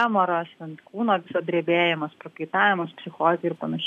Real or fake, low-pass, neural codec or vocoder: real; 14.4 kHz; none